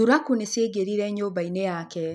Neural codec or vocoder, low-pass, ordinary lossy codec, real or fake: none; none; none; real